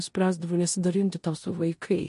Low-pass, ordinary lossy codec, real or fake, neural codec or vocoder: 10.8 kHz; MP3, 48 kbps; fake; codec, 16 kHz in and 24 kHz out, 0.9 kbps, LongCat-Audio-Codec, four codebook decoder